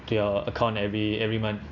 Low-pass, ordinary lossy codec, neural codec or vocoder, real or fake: 7.2 kHz; none; none; real